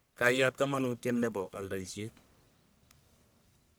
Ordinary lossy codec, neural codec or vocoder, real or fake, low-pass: none; codec, 44.1 kHz, 1.7 kbps, Pupu-Codec; fake; none